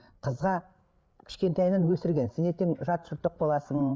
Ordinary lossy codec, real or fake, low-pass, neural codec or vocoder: none; fake; none; codec, 16 kHz, 8 kbps, FreqCodec, larger model